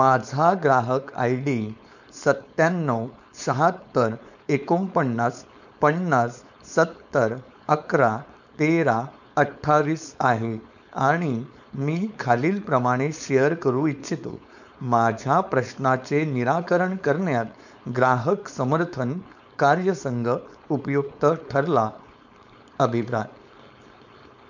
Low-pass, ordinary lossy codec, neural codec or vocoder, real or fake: 7.2 kHz; none; codec, 16 kHz, 4.8 kbps, FACodec; fake